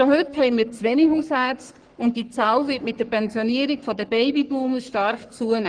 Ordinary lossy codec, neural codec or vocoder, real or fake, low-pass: Opus, 24 kbps; codec, 44.1 kHz, 3.4 kbps, Pupu-Codec; fake; 9.9 kHz